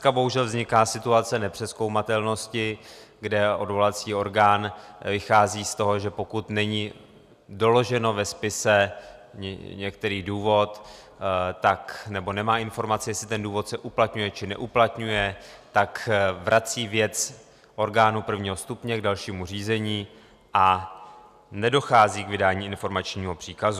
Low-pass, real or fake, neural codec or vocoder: 14.4 kHz; real; none